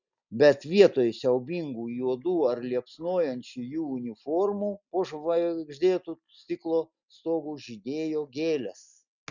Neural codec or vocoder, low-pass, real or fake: none; 7.2 kHz; real